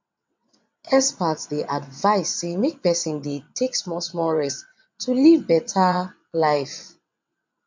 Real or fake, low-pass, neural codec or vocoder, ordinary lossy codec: fake; 7.2 kHz; vocoder, 22.05 kHz, 80 mel bands, WaveNeXt; MP3, 48 kbps